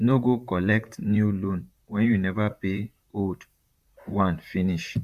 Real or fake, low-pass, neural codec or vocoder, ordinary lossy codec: fake; 14.4 kHz; vocoder, 44.1 kHz, 128 mel bands, Pupu-Vocoder; Opus, 64 kbps